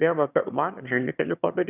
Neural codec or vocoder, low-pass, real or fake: autoencoder, 22.05 kHz, a latent of 192 numbers a frame, VITS, trained on one speaker; 3.6 kHz; fake